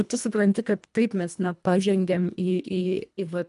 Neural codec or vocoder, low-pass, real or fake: codec, 24 kHz, 1.5 kbps, HILCodec; 10.8 kHz; fake